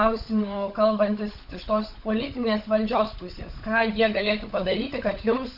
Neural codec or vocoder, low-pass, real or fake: codec, 16 kHz, 16 kbps, FunCodec, trained on LibriTTS, 50 frames a second; 5.4 kHz; fake